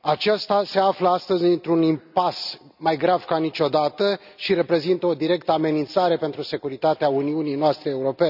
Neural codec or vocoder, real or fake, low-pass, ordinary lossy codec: none; real; 5.4 kHz; none